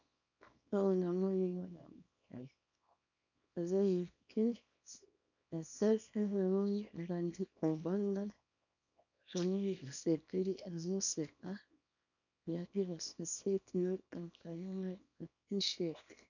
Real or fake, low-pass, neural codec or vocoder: fake; 7.2 kHz; codec, 24 kHz, 0.9 kbps, WavTokenizer, small release